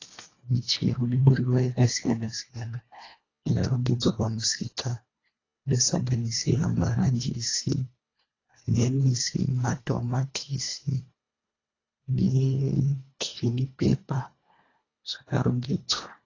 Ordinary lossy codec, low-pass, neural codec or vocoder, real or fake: AAC, 32 kbps; 7.2 kHz; codec, 24 kHz, 1.5 kbps, HILCodec; fake